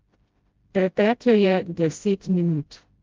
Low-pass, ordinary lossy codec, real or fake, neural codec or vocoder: 7.2 kHz; Opus, 32 kbps; fake; codec, 16 kHz, 0.5 kbps, FreqCodec, smaller model